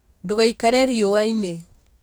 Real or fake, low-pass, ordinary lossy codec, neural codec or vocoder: fake; none; none; codec, 44.1 kHz, 2.6 kbps, DAC